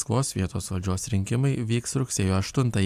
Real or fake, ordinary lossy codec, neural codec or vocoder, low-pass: real; AAC, 96 kbps; none; 14.4 kHz